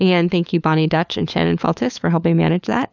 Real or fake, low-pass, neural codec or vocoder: fake; 7.2 kHz; codec, 16 kHz, 4 kbps, FunCodec, trained on LibriTTS, 50 frames a second